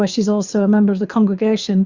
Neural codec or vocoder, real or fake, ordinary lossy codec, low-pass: codec, 24 kHz, 6 kbps, HILCodec; fake; Opus, 64 kbps; 7.2 kHz